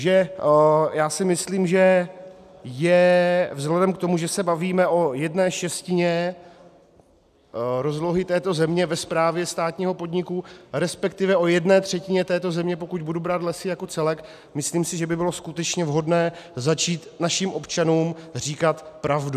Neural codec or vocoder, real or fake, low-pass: none; real; 14.4 kHz